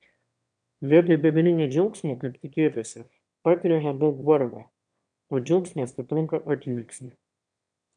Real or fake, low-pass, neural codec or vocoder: fake; 9.9 kHz; autoencoder, 22.05 kHz, a latent of 192 numbers a frame, VITS, trained on one speaker